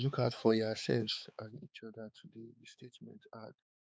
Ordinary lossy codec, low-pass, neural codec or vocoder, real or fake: none; none; codec, 16 kHz, 4 kbps, X-Codec, HuBERT features, trained on balanced general audio; fake